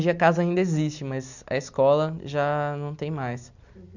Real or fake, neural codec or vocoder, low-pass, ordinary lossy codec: real; none; 7.2 kHz; none